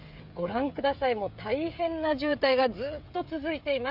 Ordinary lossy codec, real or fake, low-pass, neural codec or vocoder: none; fake; 5.4 kHz; codec, 16 kHz, 16 kbps, FreqCodec, smaller model